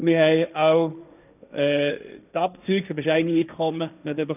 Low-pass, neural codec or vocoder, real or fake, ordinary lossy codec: 3.6 kHz; codec, 16 kHz, 1.1 kbps, Voila-Tokenizer; fake; none